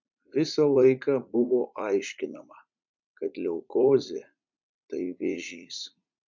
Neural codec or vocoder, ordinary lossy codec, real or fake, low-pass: vocoder, 44.1 kHz, 80 mel bands, Vocos; MP3, 64 kbps; fake; 7.2 kHz